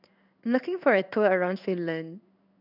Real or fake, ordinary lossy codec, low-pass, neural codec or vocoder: fake; none; 5.4 kHz; codec, 16 kHz in and 24 kHz out, 1 kbps, XY-Tokenizer